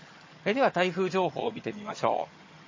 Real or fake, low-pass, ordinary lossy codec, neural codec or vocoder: fake; 7.2 kHz; MP3, 32 kbps; vocoder, 22.05 kHz, 80 mel bands, HiFi-GAN